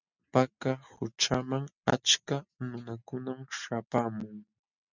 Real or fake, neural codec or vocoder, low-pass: real; none; 7.2 kHz